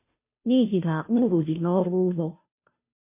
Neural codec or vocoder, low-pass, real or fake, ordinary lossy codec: codec, 16 kHz, 0.5 kbps, FunCodec, trained on Chinese and English, 25 frames a second; 3.6 kHz; fake; MP3, 24 kbps